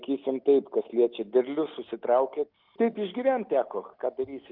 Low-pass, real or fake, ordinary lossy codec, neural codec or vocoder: 5.4 kHz; real; Opus, 24 kbps; none